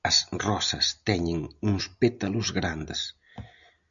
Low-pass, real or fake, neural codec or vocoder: 7.2 kHz; real; none